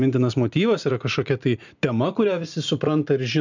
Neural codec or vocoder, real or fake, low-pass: vocoder, 44.1 kHz, 128 mel bands every 256 samples, BigVGAN v2; fake; 7.2 kHz